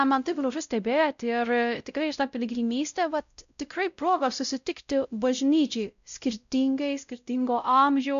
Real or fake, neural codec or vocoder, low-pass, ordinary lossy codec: fake; codec, 16 kHz, 0.5 kbps, X-Codec, WavLM features, trained on Multilingual LibriSpeech; 7.2 kHz; AAC, 96 kbps